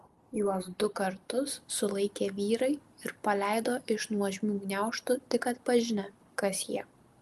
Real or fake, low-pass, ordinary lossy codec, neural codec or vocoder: real; 14.4 kHz; Opus, 32 kbps; none